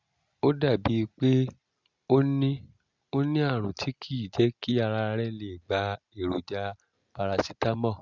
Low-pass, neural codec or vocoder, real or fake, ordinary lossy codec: 7.2 kHz; none; real; Opus, 64 kbps